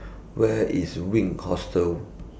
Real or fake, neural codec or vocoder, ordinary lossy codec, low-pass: real; none; none; none